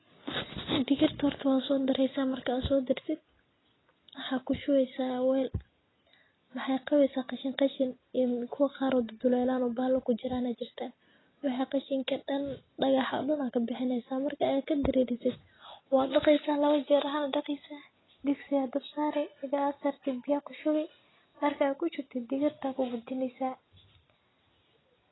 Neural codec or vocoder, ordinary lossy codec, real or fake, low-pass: none; AAC, 16 kbps; real; 7.2 kHz